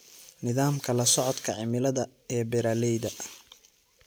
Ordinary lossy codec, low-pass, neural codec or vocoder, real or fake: none; none; none; real